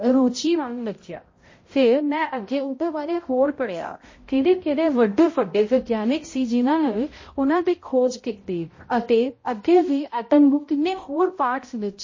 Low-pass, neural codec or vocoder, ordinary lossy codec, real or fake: 7.2 kHz; codec, 16 kHz, 0.5 kbps, X-Codec, HuBERT features, trained on balanced general audio; MP3, 32 kbps; fake